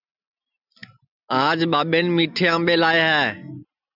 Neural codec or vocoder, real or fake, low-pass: none; real; 5.4 kHz